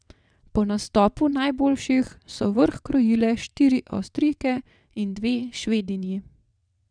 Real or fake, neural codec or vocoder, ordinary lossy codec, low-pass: fake; vocoder, 22.05 kHz, 80 mel bands, WaveNeXt; none; 9.9 kHz